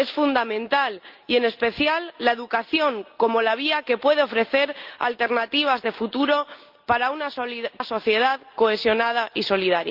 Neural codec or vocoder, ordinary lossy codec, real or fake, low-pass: none; Opus, 24 kbps; real; 5.4 kHz